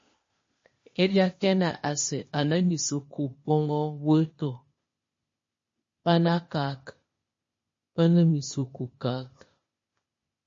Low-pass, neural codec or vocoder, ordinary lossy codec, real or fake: 7.2 kHz; codec, 16 kHz, 0.8 kbps, ZipCodec; MP3, 32 kbps; fake